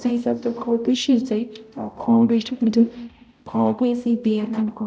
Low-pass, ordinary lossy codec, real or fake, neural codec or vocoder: none; none; fake; codec, 16 kHz, 0.5 kbps, X-Codec, HuBERT features, trained on balanced general audio